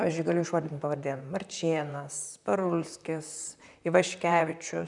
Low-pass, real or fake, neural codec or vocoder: 10.8 kHz; fake; vocoder, 44.1 kHz, 128 mel bands, Pupu-Vocoder